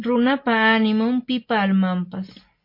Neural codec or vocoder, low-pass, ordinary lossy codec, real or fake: none; 5.4 kHz; MP3, 32 kbps; real